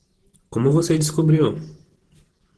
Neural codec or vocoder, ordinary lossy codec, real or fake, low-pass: vocoder, 44.1 kHz, 128 mel bands every 512 samples, BigVGAN v2; Opus, 16 kbps; fake; 10.8 kHz